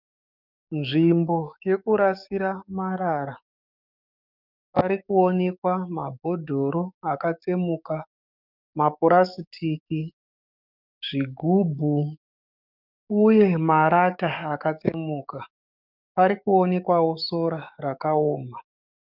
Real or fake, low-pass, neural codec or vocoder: fake; 5.4 kHz; codec, 44.1 kHz, 7.8 kbps, DAC